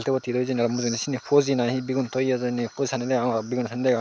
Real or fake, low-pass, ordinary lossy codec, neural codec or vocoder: real; none; none; none